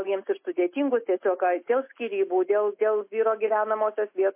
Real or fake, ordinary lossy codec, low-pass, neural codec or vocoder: real; MP3, 24 kbps; 3.6 kHz; none